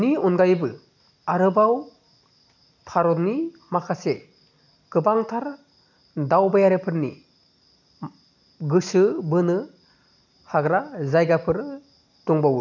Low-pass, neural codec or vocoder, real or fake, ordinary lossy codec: 7.2 kHz; none; real; none